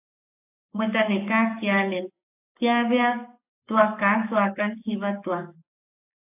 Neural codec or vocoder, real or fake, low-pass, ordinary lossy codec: codec, 44.1 kHz, 7.8 kbps, Pupu-Codec; fake; 3.6 kHz; AAC, 32 kbps